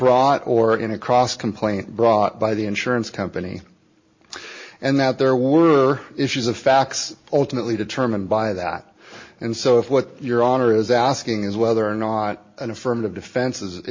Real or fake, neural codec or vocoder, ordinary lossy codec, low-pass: real; none; MP3, 32 kbps; 7.2 kHz